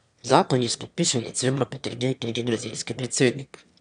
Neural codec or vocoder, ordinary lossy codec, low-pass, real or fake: autoencoder, 22.05 kHz, a latent of 192 numbers a frame, VITS, trained on one speaker; none; 9.9 kHz; fake